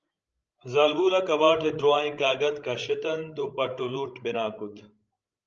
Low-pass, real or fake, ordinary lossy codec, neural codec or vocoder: 7.2 kHz; fake; Opus, 24 kbps; codec, 16 kHz, 16 kbps, FreqCodec, larger model